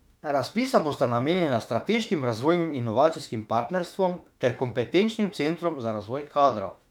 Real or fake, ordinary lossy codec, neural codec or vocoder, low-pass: fake; none; autoencoder, 48 kHz, 32 numbers a frame, DAC-VAE, trained on Japanese speech; 19.8 kHz